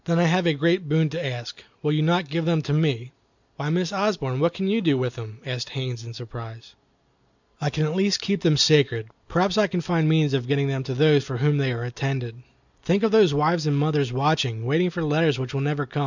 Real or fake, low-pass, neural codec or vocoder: real; 7.2 kHz; none